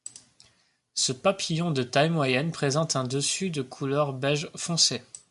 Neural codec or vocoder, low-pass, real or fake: none; 10.8 kHz; real